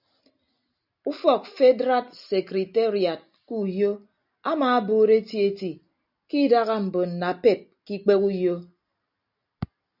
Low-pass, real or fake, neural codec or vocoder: 5.4 kHz; real; none